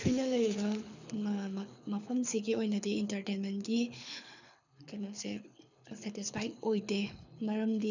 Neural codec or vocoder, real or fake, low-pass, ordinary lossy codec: codec, 24 kHz, 6 kbps, HILCodec; fake; 7.2 kHz; none